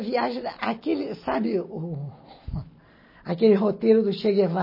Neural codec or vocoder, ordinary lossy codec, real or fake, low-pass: none; MP3, 24 kbps; real; 5.4 kHz